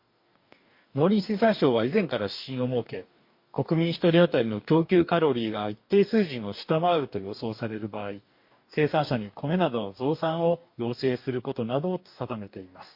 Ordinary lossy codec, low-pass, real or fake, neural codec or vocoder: MP3, 32 kbps; 5.4 kHz; fake; codec, 44.1 kHz, 2.6 kbps, DAC